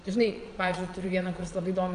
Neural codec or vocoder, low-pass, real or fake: vocoder, 22.05 kHz, 80 mel bands, Vocos; 9.9 kHz; fake